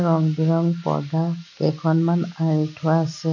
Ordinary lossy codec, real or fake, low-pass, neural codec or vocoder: none; real; 7.2 kHz; none